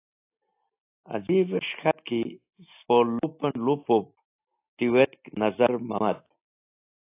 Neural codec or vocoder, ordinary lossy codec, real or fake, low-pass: none; AAC, 24 kbps; real; 3.6 kHz